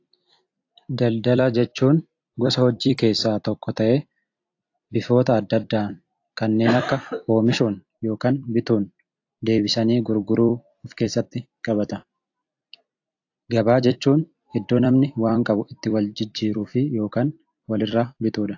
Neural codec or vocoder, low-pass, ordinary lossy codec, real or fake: vocoder, 44.1 kHz, 128 mel bands every 256 samples, BigVGAN v2; 7.2 kHz; AAC, 48 kbps; fake